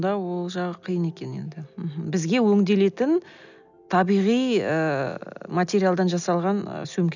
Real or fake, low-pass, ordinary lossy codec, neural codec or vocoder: real; 7.2 kHz; none; none